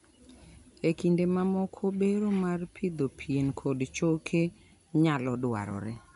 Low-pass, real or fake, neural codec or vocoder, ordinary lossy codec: 10.8 kHz; real; none; none